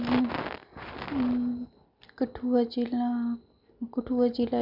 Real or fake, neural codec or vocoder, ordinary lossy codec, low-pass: real; none; none; 5.4 kHz